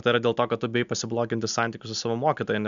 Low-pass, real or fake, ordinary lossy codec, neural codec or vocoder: 7.2 kHz; real; MP3, 96 kbps; none